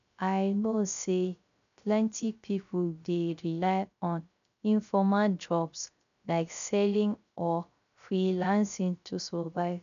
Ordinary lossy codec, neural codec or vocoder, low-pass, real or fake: none; codec, 16 kHz, 0.3 kbps, FocalCodec; 7.2 kHz; fake